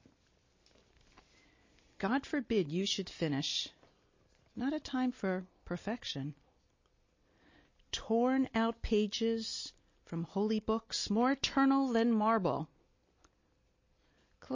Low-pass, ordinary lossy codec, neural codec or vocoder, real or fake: 7.2 kHz; MP3, 32 kbps; none; real